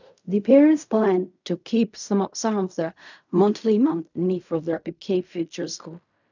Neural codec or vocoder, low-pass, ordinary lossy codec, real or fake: codec, 16 kHz in and 24 kHz out, 0.4 kbps, LongCat-Audio-Codec, fine tuned four codebook decoder; 7.2 kHz; none; fake